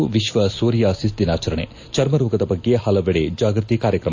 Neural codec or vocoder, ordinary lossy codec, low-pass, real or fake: none; AAC, 48 kbps; 7.2 kHz; real